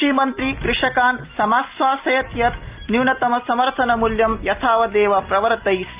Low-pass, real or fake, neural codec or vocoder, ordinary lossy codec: 3.6 kHz; real; none; Opus, 24 kbps